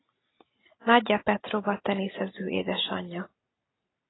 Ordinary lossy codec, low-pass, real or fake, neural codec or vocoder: AAC, 16 kbps; 7.2 kHz; real; none